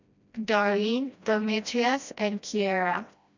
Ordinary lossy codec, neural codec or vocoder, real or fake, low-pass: none; codec, 16 kHz, 1 kbps, FreqCodec, smaller model; fake; 7.2 kHz